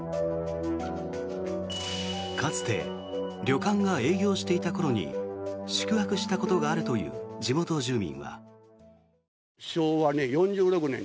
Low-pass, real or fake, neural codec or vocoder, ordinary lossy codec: none; real; none; none